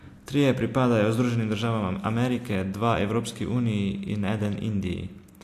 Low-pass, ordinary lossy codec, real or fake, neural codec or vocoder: 14.4 kHz; AAC, 64 kbps; real; none